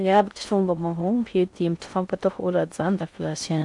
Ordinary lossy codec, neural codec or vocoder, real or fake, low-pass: MP3, 64 kbps; codec, 16 kHz in and 24 kHz out, 0.6 kbps, FocalCodec, streaming, 4096 codes; fake; 10.8 kHz